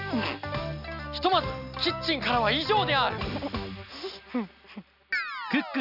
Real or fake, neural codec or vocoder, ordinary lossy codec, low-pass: real; none; none; 5.4 kHz